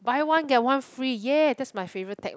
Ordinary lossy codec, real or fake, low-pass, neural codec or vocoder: none; real; none; none